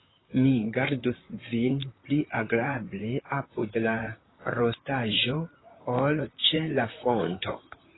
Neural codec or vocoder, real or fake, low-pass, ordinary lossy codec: vocoder, 44.1 kHz, 128 mel bands, Pupu-Vocoder; fake; 7.2 kHz; AAC, 16 kbps